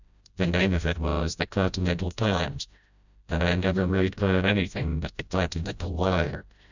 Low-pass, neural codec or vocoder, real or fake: 7.2 kHz; codec, 16 kHz, 0.5 kbps, FreqCodec, smaller model; fake